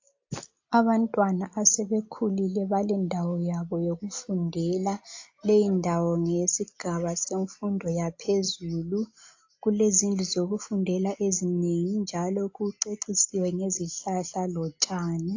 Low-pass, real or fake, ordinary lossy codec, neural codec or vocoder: 7.2 kHz; real; AAC, 48 kbps; none